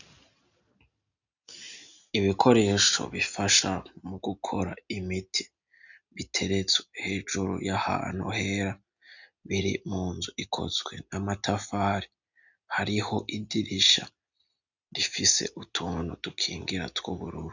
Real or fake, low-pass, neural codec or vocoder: real; 7.2 kHz; none